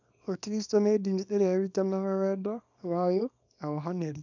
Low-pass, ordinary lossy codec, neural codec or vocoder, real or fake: 7.2 kHz; none; codec, 24 kHz, 0.9 kbps, WavTokenizer, small release; fake